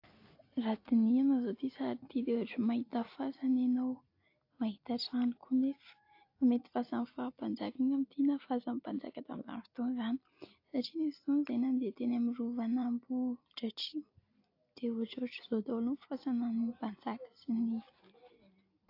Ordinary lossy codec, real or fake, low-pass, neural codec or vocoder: AAC, 32 kbps; real; 5.4 kHz; none